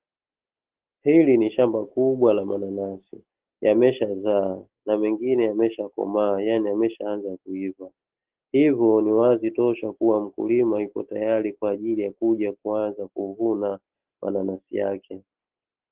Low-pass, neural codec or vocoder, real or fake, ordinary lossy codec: 3.6 kHz; none; real; Opus, 16 kbps